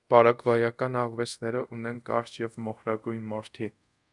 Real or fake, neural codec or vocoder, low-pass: fake; codec, 24 kHz, 0.5 kbps, DualCodec; 10.8 kHz